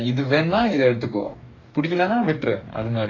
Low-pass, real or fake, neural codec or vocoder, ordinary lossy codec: 7.2 kHz; fake; codec, 44.1 kHz, 2.6 kbps, DAC; AAC, 32 kbps